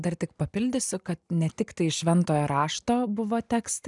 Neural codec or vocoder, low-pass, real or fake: none; 10.8 kHz; real